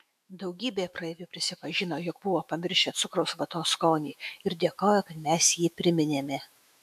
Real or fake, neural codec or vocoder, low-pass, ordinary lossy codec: fake; autoencoder, 48 kHz, 128 numbers a frame, DAC-VAE, trained on Japanese speech; 14.4 kHz; AAC, 96 kbps